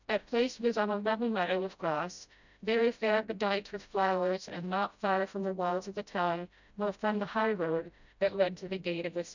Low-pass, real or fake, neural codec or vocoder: 7.2 kHz; fake; codec, 16 kHz, 0.5 kbps, FreqCodec, smaller model